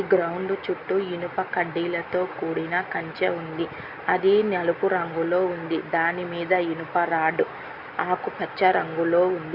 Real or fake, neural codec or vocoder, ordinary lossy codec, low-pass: real; none; Opus, 64 kbps; 5.4 kHz